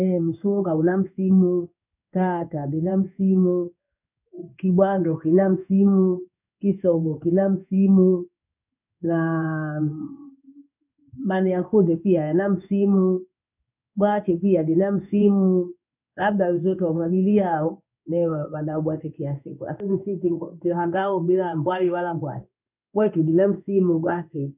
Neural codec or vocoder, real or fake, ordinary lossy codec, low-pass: codec, 16 kHz in and 24 kHz out, 1 kbps, XY-Tokenizer; fake; none; 3.6 kHz